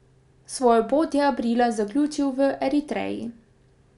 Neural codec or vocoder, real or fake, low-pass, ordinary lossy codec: none; real; 10.8 kHz; none